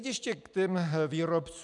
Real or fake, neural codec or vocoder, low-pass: real; none; 10.8 kHz